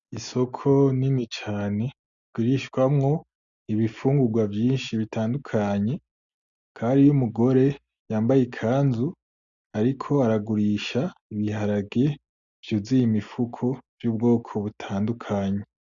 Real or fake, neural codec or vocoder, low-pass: real; none; 7.2 kHz